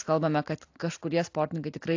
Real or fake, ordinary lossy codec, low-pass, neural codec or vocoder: real; AAC, 48 kbps; 7.2 kHz; none